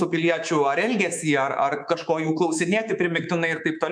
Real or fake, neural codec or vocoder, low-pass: fake; codec, 24 kHz, 3.1 kbps, DualCodec; 9.9 kHz